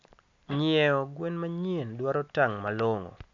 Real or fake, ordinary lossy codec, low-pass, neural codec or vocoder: real; AAC, 64 kbps; 7.2 kHz; none